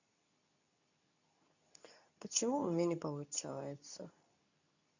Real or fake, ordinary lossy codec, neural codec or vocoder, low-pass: fake; none; codec, 24 kHz, 0.9 kbps, WavTokenizer, medium speech release version 2; 7.2 kHz